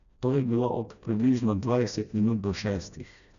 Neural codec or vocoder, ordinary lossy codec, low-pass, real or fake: codec, 16 kHz, 1 kbps, FreqCodec, smaller model; MP3, 96 kbps; 7.2 kHz; fake